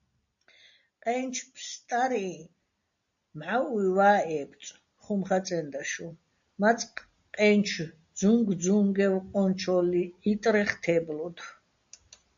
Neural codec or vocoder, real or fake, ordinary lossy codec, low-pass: none; real; AAC, 64 kbps; 7.2 kHz